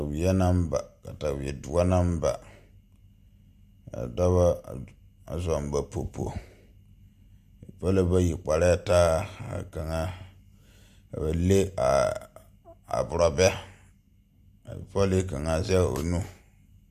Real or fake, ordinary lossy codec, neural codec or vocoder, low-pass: real; MP3, 96 kbps; none; 14.4 kHz